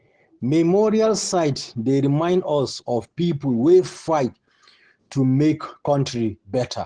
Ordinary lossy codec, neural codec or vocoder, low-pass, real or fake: Opus, 16 kbps; none; 9.9 kHz; real